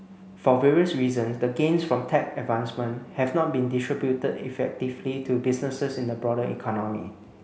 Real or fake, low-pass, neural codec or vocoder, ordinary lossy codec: real; none; none; none